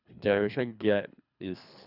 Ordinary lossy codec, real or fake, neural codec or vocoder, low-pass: none; fake; codec, 24 kHz, 3 kbps, HILCodec; 5.4 kHz